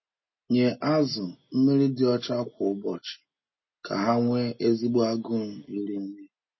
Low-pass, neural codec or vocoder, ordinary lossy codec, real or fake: 7.2 kHz; none; MP3, 24 kbps; real